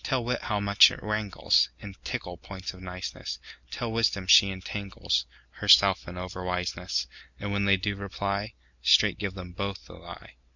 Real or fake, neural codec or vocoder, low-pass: real; none; 7.2 kHz